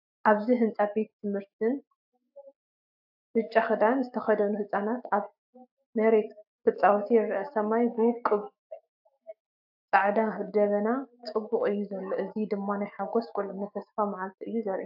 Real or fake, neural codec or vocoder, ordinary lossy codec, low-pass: fake; autoencoder, 48 kHz, 128 numbers a frame, DAC-VAE, trained on Japanese speech; AAC, 48 kbps; 5.4 kHz